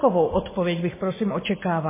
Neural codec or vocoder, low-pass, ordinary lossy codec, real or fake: none; 3.6 kHz; MP3, 16 kbps; real